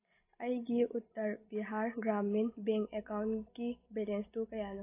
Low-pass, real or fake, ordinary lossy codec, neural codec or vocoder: 3.6 kHz; real; AAC, 24 kbps; none